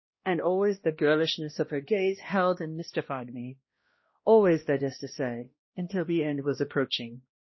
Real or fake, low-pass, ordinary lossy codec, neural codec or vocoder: fake; 7.2 kHz; MP3, 24 kbps; codec, 16 kHz, 1 kbps, X-Codec, HuBERT features, trained on balanced general audio